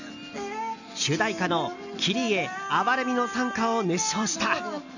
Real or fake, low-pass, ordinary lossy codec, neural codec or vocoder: real; 7.2 kHz; none; none